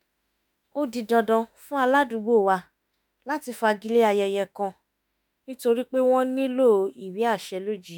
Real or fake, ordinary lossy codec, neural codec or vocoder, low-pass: fake; none; autoencoder, 48 kHz, 32 numbers a frame, DAC-VAE, trained on Japanese speech; none